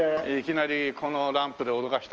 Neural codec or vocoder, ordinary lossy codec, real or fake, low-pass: none; Opus, 24 kbps; real; 7.2 kHz